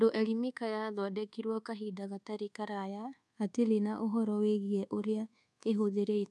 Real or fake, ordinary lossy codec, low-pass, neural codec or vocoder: fake; none; none; codec, 24 kHz, 1.2 kbps, DualCodec